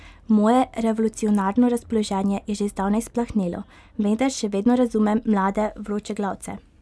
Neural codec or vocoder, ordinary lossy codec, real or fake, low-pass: none; none; real; none